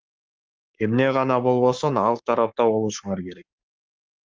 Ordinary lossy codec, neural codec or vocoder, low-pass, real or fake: Opus, 32 kbps; codec, 44.1 kHz, 7.8 kbps, Pupu-Codec; 7.2 kHz; fake